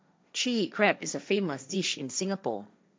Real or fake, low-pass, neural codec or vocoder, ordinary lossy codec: fake; none; codec, 16 kHz, 1.1 kbps, Voila-Tokenizer; none